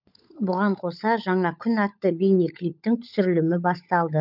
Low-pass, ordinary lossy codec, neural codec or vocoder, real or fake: 5.4 kHz; none; codec, 16 kHz, 16 kbps, FunCodec, trained on LibriTTS, 50 frames a second; fake